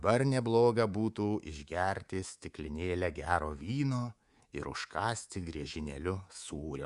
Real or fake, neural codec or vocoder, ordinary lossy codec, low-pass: fake; codec, 24 kHz, 3.1 kbps, DualCodec; Opus, 64 kbps; 10.8 kHz